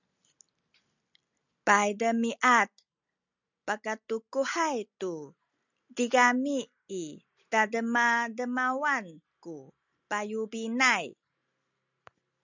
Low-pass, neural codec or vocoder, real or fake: 7.2 kHz; none; real